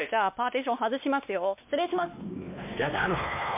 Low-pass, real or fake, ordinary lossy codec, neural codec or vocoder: 3.6 kHz; fake; MP3, 32 kbps; codec, 16 kHz, 2 kbps, X-Codec, WavLM features, trained on Multilingual LibriSpeech